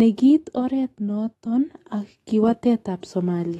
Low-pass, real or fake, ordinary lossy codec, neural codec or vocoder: 19.8 kHz; fake; AAC, 32 kbps; autoencoder, 48 kHz, 128 numbers a frame, DAC-VAE, trained on Japanese speech